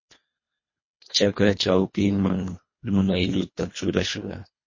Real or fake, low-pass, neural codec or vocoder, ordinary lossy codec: fake; 7.2 kHz; codec, 24 kHz, 1.5 kbps, HILCodec; MP3, 32 kbps